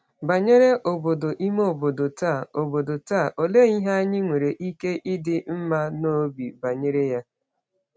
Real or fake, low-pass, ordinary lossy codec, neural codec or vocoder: real; none; none; none